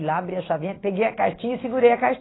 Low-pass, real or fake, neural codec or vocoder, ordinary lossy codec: 7.2 kHz; real; none; AAC, 16 kbps